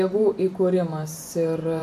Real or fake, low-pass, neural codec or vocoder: fake; 14.4 kHz; vocoder, 44.1 kHz, 128 mel bands every 512 samples, BigVGAN v2